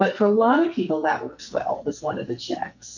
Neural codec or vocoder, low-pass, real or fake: codec, 44.1 kHz, 2.6 kbps, SNAC; 7.2 kHz; fake